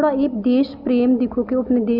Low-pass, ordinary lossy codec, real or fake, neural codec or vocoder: 5.4 kHz; none; real; none